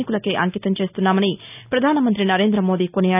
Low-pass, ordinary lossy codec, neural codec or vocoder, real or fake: 3.6 kHz; none; none; real